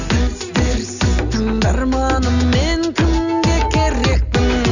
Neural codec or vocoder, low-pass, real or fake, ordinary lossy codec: none; 7.2 kHz; real; none